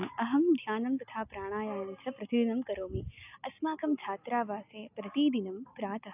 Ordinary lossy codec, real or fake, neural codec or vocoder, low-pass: AAC, 32 kbps; real; none; 3.6 kHz